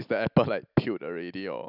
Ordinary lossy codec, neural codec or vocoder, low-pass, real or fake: none; none; 5.4 kHz; real